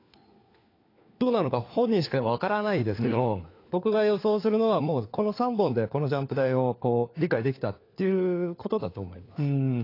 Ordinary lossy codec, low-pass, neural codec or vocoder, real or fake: AAC, 32 kbps; 5.4 kHz; codec, 16 kHz, 4 kbps, FunCodec, trained on LibriTTS, 50 frames a second; fake